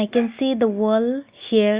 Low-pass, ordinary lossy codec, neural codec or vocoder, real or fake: 3.6 kHz; Opus, 64 kbps; none; real